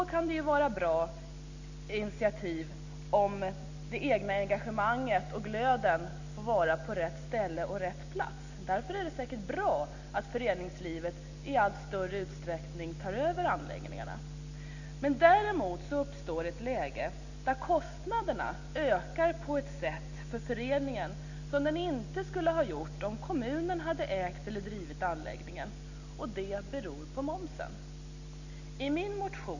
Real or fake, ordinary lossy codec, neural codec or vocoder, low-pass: real; none; none; 7.2 kHz